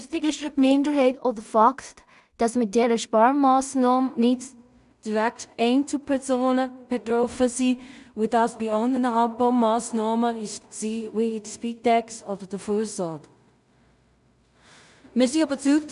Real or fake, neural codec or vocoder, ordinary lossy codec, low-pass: fake; codec, 16 kHz in and 24 kHz out, 0.4 kbps, LongCat-Audio-Codec, two codebook decoder; none; 10.8 kHz